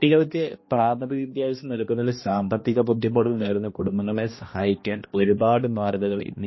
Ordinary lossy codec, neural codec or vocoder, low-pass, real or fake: MP3, 24 kbps; codec, 16 kHz, 1 kbps, X-Codec, HuBERT features, trained on balanced general audio; 7.2 kHz; fake